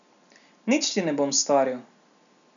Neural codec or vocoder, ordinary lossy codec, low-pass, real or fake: none; none; 7.2 kHz; real